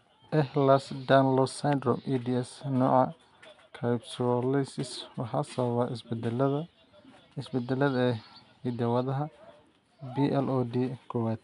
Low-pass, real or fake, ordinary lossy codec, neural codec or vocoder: 10.8 kHz; real; none; none